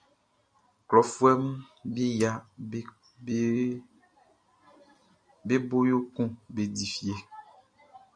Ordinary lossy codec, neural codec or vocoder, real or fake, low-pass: AAC, 64 kbps; none; real; 9.9 kHz